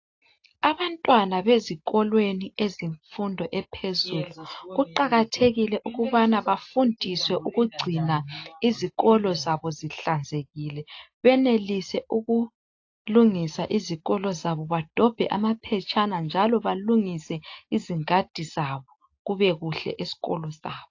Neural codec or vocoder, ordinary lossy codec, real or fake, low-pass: none; AAC, 48 kbps; real; 7.2 kHz